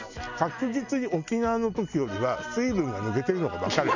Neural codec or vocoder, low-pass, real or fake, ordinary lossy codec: none; 7.2 kHz; real; none